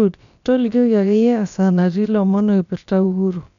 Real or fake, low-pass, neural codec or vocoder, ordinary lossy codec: fake; 7.2 kHz; codec, 16 kHz, about 1 kbps, DyCAST, with the encoder's durations; none